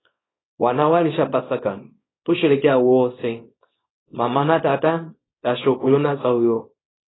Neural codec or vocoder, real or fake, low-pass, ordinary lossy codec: codec, 24 kHz, 0.9 kbps, WavTokenizer, small release; fake; 7.2 kHz; AAC, 16 kbps